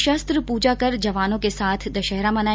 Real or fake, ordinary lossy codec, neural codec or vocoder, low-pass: real; none; none; none